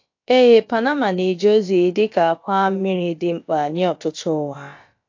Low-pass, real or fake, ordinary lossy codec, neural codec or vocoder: 7.2 kHz; fake; AAC, 48 kbps; codec, 16 kHz, about 1 kbps, DyCAST, with the encoder's durations